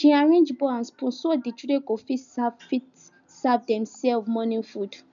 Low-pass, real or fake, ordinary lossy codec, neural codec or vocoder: 7.2 kHz; real; none; none